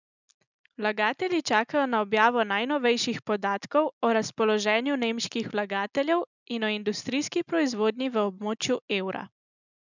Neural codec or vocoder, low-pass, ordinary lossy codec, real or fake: none; 7.2 kHz; none; real